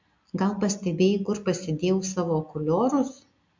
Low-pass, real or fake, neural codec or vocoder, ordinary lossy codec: 7.2 kHz; real; none; MP3, 64 kbps